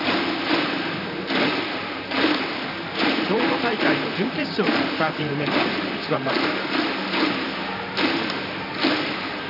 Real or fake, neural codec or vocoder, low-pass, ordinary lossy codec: fake; vocoder, 44.1 kHz, 128 mel bands, Pupu-Vocoder; 5.4 kHz; none